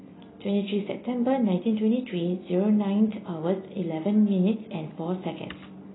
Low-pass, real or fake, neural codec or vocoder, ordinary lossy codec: 7.2 kHz; real; none; AAC, 16 kbps